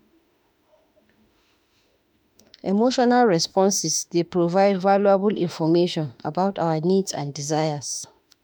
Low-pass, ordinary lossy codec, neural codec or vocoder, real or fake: none; none; autoencoder, 48 kHz, 32 numbers a frame, DAC-VAE, trained on Japanese speech; fake